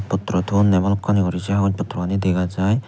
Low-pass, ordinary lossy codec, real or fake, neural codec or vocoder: none; none; real; none